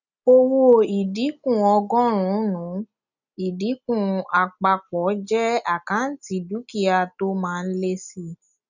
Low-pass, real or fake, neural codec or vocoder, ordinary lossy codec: 7.2 kHz; real; none; none